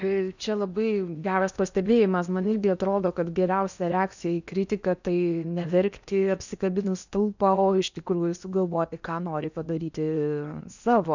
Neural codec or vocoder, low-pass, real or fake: codec, 16 kHz in and 24 kHz out, 0.8 kbps, FocalCodec, streaming, 65536 codes; 7.2 kHz; fake